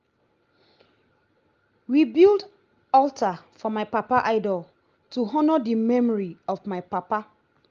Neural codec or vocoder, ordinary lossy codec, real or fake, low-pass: none; Opus, 32 kbps; real; 7.2 kHz